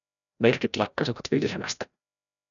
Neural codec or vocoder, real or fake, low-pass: codec, 16 kHz, 0.5 kbps, FreqCodec, larger model; fake; 7.2 kHz